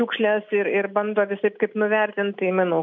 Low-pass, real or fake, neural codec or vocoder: 7.2 kHz; real; none